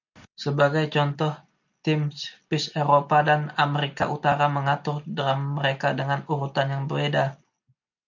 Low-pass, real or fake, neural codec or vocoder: 7.2 kHz; real; none